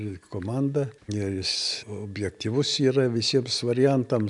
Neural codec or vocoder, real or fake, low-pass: none; real; 10.8 kHz